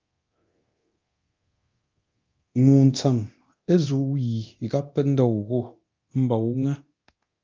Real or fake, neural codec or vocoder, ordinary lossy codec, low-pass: fake; codec, 24 kHz, 0.9 kbps, DualCodec; Opus, 24 kbps; 7.2 kHz